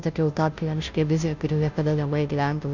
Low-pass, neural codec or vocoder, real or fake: 7.2 kHz; codec, 16 kHz, 0.5 kbps, FunCodec, trained on Chinese and English, 25 frames a second; fake